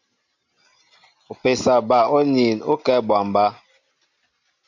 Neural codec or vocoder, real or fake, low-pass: none; real; 7.2 kHz